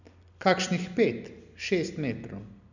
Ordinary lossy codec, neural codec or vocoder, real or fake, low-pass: none; none; real; 7.2 kHz